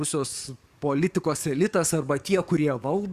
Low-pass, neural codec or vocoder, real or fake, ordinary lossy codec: 14.4 kHz; codec, 44.1 kHz, 7.8 kbps, DAC; fake; Opus, 64 kbps